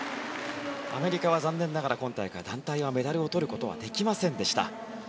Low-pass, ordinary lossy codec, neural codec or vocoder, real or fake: none; none; none; real